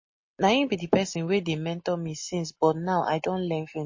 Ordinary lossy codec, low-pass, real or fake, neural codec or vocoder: MP3, 32 kbps; 7.2 kHz; real; none